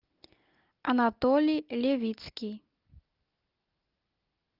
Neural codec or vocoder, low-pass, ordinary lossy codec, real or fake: none; 5.4 kHz; Opus, 32 kbps; real